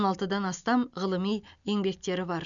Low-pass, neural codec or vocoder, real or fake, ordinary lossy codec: 7.2 kHz; none; real; none